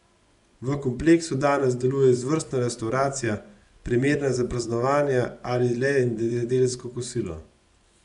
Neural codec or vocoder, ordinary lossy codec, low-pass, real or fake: vocoder, 24 kHz, 100 mel bands, Vocos; none; 10.8 kHz; fake